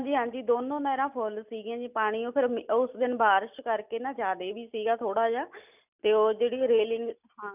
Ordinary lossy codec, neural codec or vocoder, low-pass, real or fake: none; none; 3.6 kHz; real